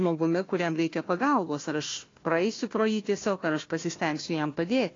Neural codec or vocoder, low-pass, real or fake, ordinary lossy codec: codec, 16 kHz, 1 kbps, FunCodec, trained on Chinese and English, 50 frames a second; 7.2 kHz; fake; AAC, 32 kbps